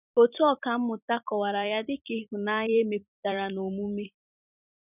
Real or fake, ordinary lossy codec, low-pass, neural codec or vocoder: fake; none; 3.6 kHz; vocoder, 44.1 kHz, 128 mel bands every 256 samples, BigVGAN v2